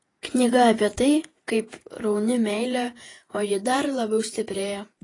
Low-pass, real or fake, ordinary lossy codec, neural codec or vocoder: 10.8 kHz; fake; AAC, 32 kbps; vocoder, 48 kHz, 128 mel bands, Vocos